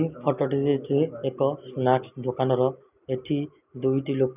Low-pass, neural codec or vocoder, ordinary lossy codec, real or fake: 3.6 kHz; none; none; real